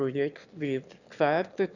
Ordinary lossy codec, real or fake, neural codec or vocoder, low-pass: none; fake; autoencoder, 22.05 kHz, a latent of 192 numbers a frame, VITS, trained on one speaker; 7.2 kHz